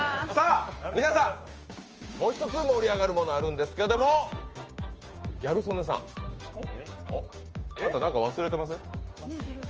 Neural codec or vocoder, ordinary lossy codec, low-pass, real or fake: codec, 16 kHz, 6 kbps, DAC; Opus, 24 kbps; 7.2 kHz; fake